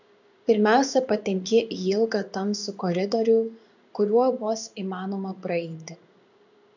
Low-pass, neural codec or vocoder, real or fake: 7.2 kHz; codec, 16 kHz in and 24 kHz out, 1 kbps, XY-Tokenizer; fake